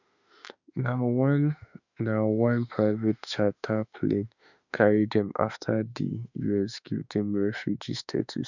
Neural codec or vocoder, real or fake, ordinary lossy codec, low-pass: autoencoder, 48 kHz, 32 numbers a frame, DAC-VAE, trained on Japanese speech; fake; none; 7.2 kHz